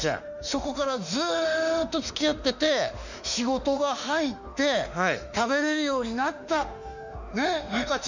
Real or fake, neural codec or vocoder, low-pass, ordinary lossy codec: fake; autoencoder, 48 kHz, 32 numbers a frame, DAC-VAE, trained on Japanese speech; 7.2 kHz; none